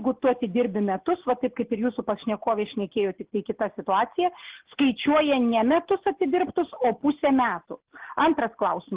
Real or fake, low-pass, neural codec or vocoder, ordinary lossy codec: real; 3.6 kHz; none; Opus, 16 kbps